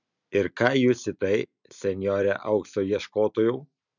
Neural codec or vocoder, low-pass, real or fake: none; 7.2 kHz; real